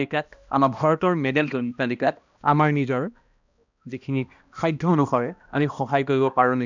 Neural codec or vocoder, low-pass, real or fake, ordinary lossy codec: codec, 16 kHz, 1 kbps, X-Codec, HuBERT features, trained on balanced general audio; 7.2 kHz; fake; none